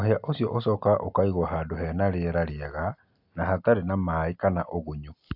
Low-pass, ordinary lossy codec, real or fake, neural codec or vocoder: 5.4 kHz; AAC, 48 kbps; real; none